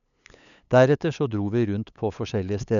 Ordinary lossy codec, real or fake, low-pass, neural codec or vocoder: none; fake; 7.2 kHz; codec, 16 kHz, 8 kbps, FunCodec, trained on LibriTTS, 25 frames a second